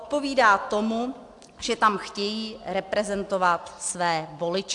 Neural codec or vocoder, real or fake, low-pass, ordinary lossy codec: none; real; 10.8 kHz; AAC, 64 kbps